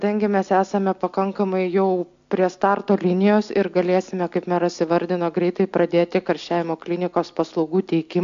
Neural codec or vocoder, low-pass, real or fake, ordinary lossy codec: none; 7.2 kHz; real; AAC, 64 kbps